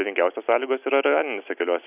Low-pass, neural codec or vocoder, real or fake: 3.6 kHz; none; real